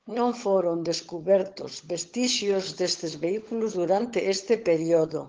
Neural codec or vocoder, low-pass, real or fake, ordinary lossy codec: codec, 16 kHz, 16 kbps, FunCodec, trained on LibriTTS, 50 frames a second; 7.2 kHz; fake; Opus, 16 kbps